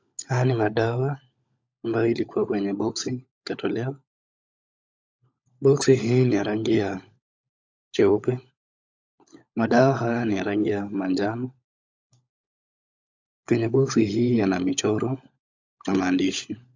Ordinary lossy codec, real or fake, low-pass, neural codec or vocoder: AAC, 48 kbps; fake; 7.2 kHz; codec, 16 kHz, 16 kbps, FunCodec, trained on LibriTTS, 50 frames a second